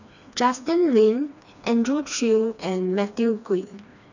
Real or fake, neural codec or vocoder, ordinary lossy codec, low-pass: fake; codec, 16 kHz, 2 kbps, FreqCodec, smaller model; none; 7.2 kHz